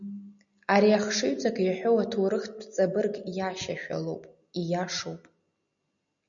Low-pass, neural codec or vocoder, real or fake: 7.2 kHz; none; real